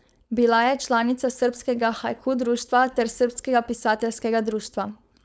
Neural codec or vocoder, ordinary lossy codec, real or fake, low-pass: codec, 16 kHz, 4.8 kbps, FACodec; none; fake; none